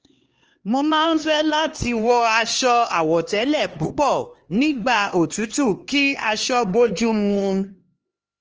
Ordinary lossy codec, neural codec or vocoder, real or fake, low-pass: Opus, 24 kbps; codec, 16 kHz, 2 kbps, X-Codec, HuBERT features, trained on LibriSpeech; fake; 7.2 kHz